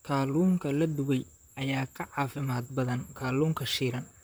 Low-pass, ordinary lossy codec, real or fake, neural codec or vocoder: none; none; fake; vocoder, 44.1 kHz, 128 mel bands, Pupu-Vocoder